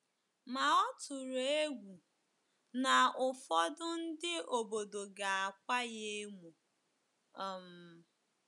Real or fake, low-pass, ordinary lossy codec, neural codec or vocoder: real; 10.8 kHz; none; none